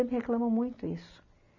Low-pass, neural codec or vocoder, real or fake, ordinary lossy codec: 7.2 kHz; none; real; none